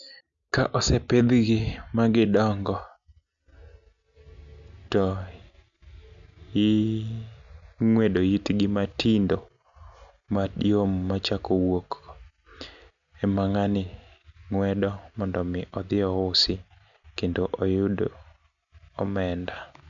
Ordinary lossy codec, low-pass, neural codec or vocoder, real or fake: none; 7.2 kHz; none; real